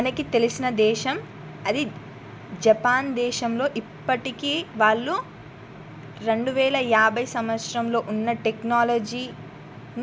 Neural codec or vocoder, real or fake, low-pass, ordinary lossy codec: none; real; none; none